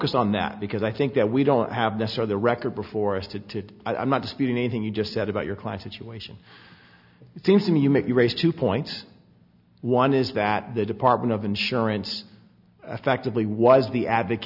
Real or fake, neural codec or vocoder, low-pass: real; none; 5.4 kHz